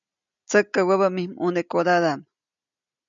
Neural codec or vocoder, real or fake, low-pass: none; real; 7.2 kHz